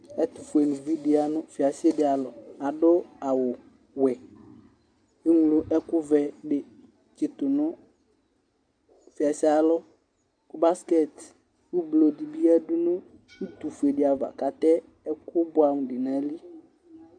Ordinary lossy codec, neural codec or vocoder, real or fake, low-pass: AAC, 64 kbps; none; real; 9.9 kHz